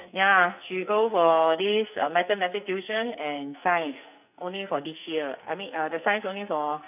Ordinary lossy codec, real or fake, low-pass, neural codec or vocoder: none; fake; 3.6 kHz; codec, 44.1 kHz, 2.6 kbps, SNAC